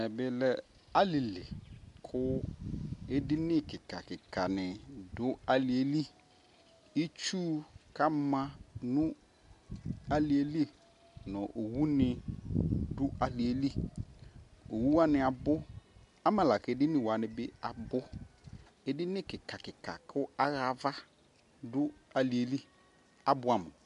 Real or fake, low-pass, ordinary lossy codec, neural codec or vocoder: real; 10.8 kHz; MP3, 64 kbps; none